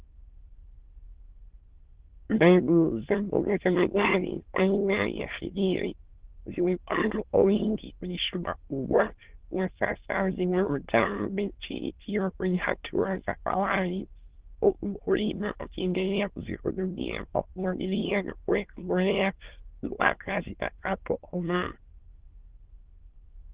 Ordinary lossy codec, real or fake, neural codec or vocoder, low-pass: Opus, 16 kbps; fake; autoencoder, 22.05 kHz, a latent of 192 numbers a frame, VITS, trained on many speakers; 3.6 kHz